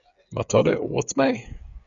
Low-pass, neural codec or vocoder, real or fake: 7.2 kHz; codec, 16 kHz, 8 kbps, FreqCodec, smaller model; fake